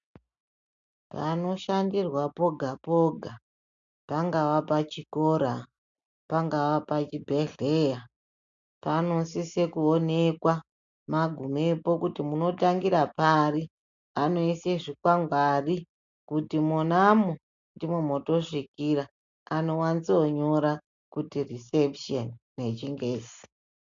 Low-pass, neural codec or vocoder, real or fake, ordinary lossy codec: 7.2 kHz; none; real; MP3, 64 kbps